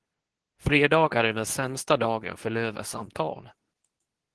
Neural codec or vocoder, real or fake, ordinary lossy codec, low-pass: codec, 24 kHz, 0.9 kbps, WavTokenizer, medium speech release version 2; fake; Opus, 16 kbps; 10.8 kHz